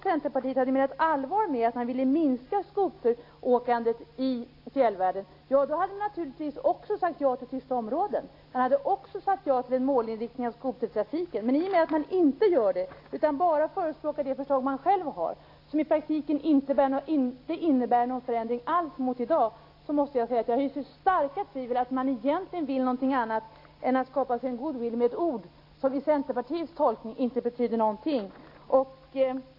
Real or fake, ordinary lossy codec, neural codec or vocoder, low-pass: real; AAC, 32 kbps; none; 5.4 kHz